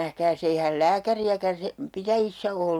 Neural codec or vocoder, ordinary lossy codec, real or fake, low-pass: none; none; real; 19.8 kHz